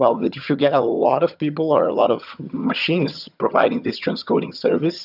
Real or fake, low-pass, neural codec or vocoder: fake; 5.4 kHz; vocoder, 22.05 kHz, 80 mel bands, HiFi-GAN